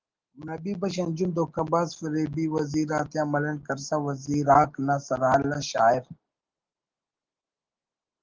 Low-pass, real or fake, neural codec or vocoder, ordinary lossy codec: 7.2 kHz; real; none; Opus, 16 kbps